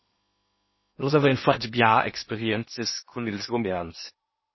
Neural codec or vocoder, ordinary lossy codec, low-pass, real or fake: codec, 16 kHz in and 24 kHz out, 0.8 kbps, FocalCodec, streaming, 65536 codes; MP3, 24 kbps; 7.2 kHz; fake